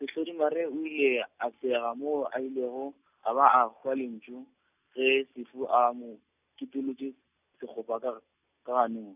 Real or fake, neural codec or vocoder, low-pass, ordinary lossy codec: real; none; 3.6 kHz; none